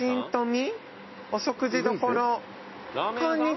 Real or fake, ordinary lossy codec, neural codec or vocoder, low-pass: real; MP3, 24 kbps; none; 7.2 kHz